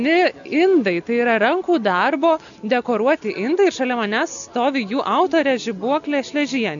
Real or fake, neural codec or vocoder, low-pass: real; none; 7.2 kHz